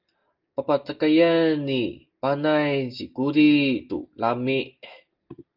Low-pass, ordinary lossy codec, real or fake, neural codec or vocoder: 5.4 kHz; Opus, 24 kbps; real; none